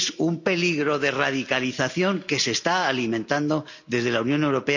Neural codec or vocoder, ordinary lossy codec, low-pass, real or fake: none; none; 7.2 kHz; real